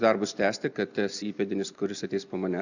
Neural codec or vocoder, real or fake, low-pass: none; real; 7.2 kHz